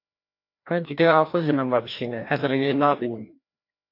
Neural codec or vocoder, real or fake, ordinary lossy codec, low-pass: codec, 16 kHz, 1 kbps, FreqCodec, larger model; fake; AAC, 32 kbps; 5.4 kHz